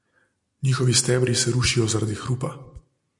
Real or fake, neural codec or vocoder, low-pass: real; none; 10.8 kHz